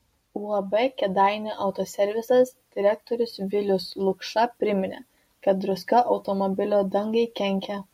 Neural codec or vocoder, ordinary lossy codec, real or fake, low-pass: none; MP3, 64 kbps; real; 19.8 kHz